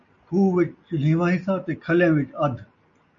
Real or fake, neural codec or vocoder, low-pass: real; none; 7.2 kHz